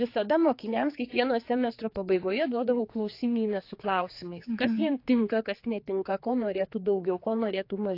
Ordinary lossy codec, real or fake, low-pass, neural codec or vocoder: AAC, 32 kbps; fake; 5.4 kHz; codec, 16 kHz, 4 kbps, X-Codec, HuBERT features, trained on general audio